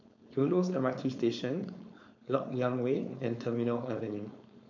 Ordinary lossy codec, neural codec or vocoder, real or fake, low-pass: none; codec, 16 kHz, 4.8 kbps, FACodec; fake; 7.2 kHz